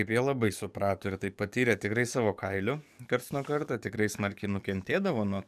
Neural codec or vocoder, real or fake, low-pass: codec, 44.1 kHz, 7.8 kbps, DAC; fake; 14.4 kHz